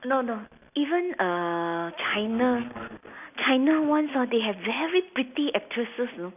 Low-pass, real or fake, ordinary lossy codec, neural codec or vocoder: 3.6 kHz; real; none; none